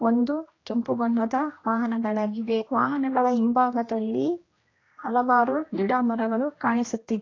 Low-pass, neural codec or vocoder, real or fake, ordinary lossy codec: 7.2 kHz; codec, 16 kHz, 1 kbps, X-Codec, HuBERT features, trained on general audio; fake; AAC, 32 kbps